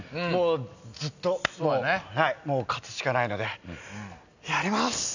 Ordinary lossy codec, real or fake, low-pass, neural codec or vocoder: none; real; 7.2 kHz; none